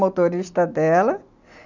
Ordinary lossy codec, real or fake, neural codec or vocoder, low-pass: none; real; none; 7.2 kHz